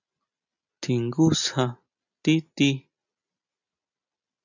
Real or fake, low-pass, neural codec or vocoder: real; 7.2 kHz; none